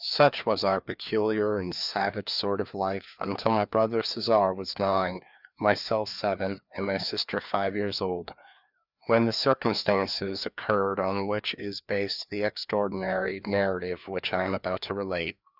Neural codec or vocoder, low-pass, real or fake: codec, 16 kHz, 2 kbps, FreqCodec, larger model; 5.4 kHz; fake